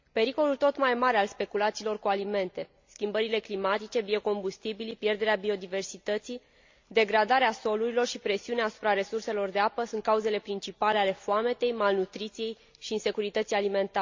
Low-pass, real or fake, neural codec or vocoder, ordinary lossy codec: 7.2 kHz; real; none; MP3, 64 kbps